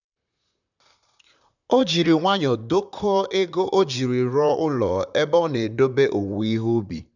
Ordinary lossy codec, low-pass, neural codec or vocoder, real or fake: none; 7.2 kHz; vocoder, 44.1 kHz, 128 mel bands, Pupu-Vocoder; fake